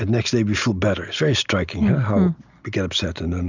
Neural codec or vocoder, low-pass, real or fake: none; 7.2 kHz; real